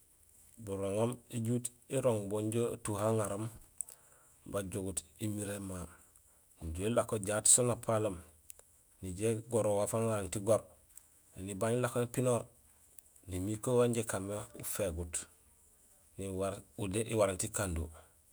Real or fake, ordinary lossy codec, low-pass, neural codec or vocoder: fake; none; none; autoencoder, 48 kHz, 128 numbers a frame, DAC-VAE, trained on Japanese speech